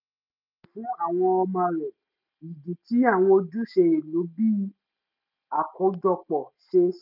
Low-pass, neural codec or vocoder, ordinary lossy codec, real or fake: 5.4 kHz; none; none; real